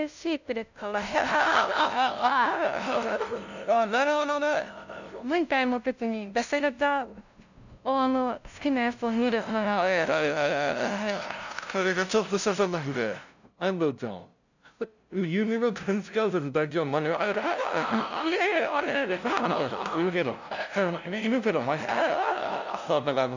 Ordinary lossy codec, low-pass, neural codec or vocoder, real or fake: none; 7.2 kHz; codec, 16 kHz, 0.5 kbps, FunCodec, trained on LibriTTS, 25 frames a second; fake